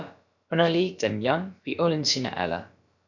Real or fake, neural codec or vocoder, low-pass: fake; codec, 16 kHz, about 1 kbps, DyCAST, with the encoder's durations; 7.2 kHz